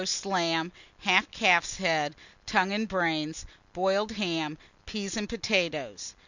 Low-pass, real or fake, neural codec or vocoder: 7.2 kHz; real; none